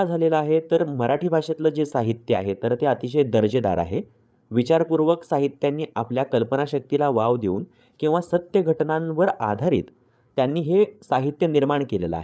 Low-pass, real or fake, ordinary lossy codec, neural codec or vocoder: none; fake; none; codec, 16 kHz, 8 kbps, FreqCodec, larger model